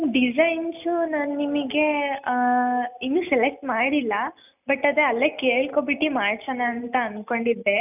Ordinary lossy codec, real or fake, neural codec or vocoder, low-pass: none; real; none; 3.6 kHz